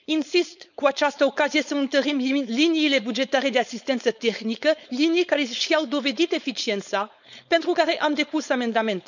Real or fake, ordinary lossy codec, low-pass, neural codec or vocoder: fake; none; 7.2 kHz; codec, 16 kHz, 4.8 kbps, FACodec